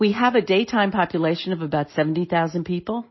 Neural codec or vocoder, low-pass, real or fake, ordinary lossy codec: none; 7.2 kHz; real; MP3, 24 kbps